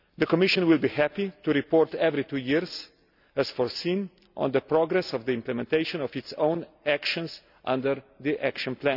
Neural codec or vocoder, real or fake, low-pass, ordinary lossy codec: none; real; 5.4 kHz; none